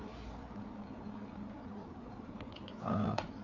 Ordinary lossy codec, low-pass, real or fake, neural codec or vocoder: MP3, 48 kbps; 7.2 kHz; fake; codec, 16 kHz, 4 kbps, FreqCodec, larger model